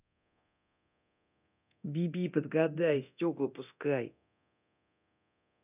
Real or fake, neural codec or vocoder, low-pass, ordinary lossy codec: fake; codec, 24 kHz, 0.9 kbps, DualCodec; 3.6 kHz; none